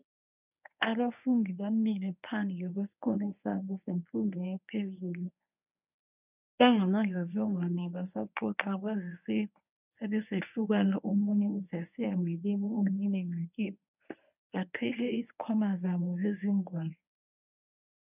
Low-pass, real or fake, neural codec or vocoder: 3.6 kHz; fake; codec, 24 kHz, 0.9 kbps, WavTokenizer, medium speech release version 1